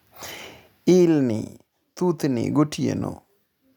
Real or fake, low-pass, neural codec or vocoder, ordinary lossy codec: real; 19.8 kHz; none; none